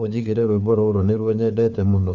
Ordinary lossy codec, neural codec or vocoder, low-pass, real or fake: none; codec, 16 kHz, 4 kbps, FunCodec, trained on LibriTTS, 50 frames a second; 7.2 kHz; fake